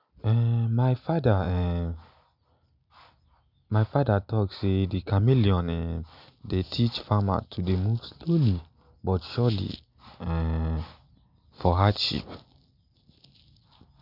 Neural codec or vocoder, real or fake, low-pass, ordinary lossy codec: none; real; 5.4 kHz; none